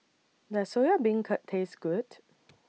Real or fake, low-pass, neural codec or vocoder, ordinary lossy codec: real; none; none; none